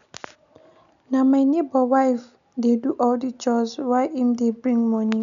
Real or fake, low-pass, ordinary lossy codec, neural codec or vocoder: real; 7.2 kHz; none; none